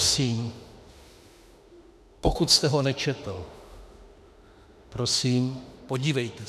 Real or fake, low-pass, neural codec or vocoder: fake; 14.4 kHz; autoencoder, 48 kHz, 32 numbers a frame, DAC-VAE, trained on Japanese speech